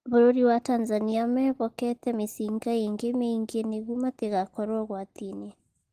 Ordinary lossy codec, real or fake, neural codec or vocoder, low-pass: Opus, 16 kbps; real; none; 14.4 kHz